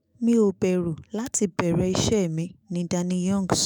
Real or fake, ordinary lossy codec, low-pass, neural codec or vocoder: fake; none; none; autoencoder, 48 kHz, 128 numbers a frame, DAC-VAE, trained on Japanese speech